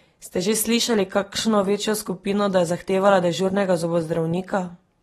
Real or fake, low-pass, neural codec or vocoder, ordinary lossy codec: real; 19.8 kHz; none; AAC, 32 kbps